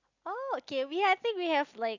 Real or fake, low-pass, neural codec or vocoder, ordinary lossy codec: real; 7.2 kHz; none; Opus, 64 kbps